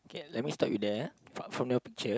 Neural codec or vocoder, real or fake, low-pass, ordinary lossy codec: none; real; none; none